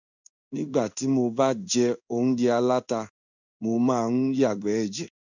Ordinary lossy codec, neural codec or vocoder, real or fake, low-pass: none; codec, 16 kHz in and 24 kHz out, 1 kbps, XY-Tokenizer; fake; 7.2 kHz